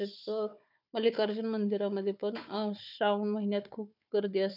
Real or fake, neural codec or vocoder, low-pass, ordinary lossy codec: fake; codec, 16 kHz, 4 kbps, FunCodec, trained on Chinese and English, 50 frames a second; 5.4 kHz; none